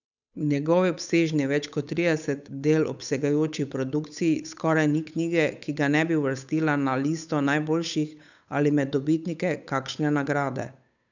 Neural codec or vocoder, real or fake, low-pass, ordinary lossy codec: codec, 16 kHz, 8 kbps, FunCodec, trained on Chinese and English, 25 frames a second; fake; 7.2 kHz; none